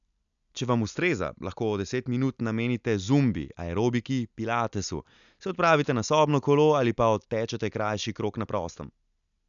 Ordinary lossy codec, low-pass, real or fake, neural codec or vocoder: none; 7.2 kHz; real; none